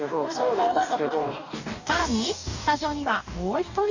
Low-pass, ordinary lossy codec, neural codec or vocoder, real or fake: 7.2 kHz; none; codec, 44.1 kHz, 2.6 kbps, DAC; fake